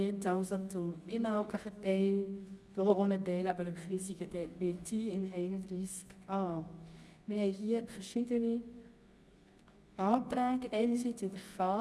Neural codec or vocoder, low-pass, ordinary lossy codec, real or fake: codec, 24 kHz, 0.9 kbps, WavTokenizer, medium music audio release; none; none; fake